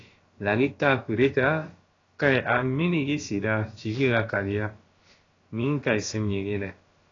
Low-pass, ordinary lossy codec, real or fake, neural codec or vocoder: 7.2 kHz; AAC, 32 kbps; fake; codec, 16 kHz, about 1 kbps, DyCAST, with the encoder's durations